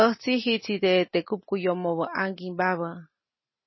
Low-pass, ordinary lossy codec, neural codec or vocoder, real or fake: 7.2 kHz; MP3, 24 kbps; none; real